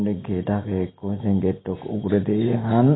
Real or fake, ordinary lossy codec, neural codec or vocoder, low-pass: real; AAC, 16 kbps; none; 7.2 kHz